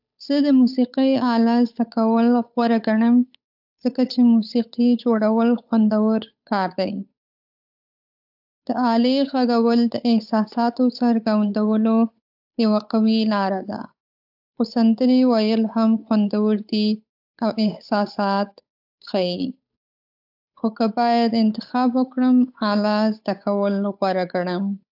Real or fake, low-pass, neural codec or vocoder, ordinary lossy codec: fake; 5.4 kHz; codec, 16 kHz, 8 kbps, FunCodec, trained on Chinese and English, 25 frames a second; AAC, 48 kbps